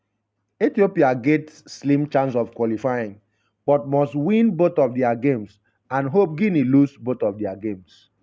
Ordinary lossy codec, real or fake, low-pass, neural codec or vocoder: none; real; none; none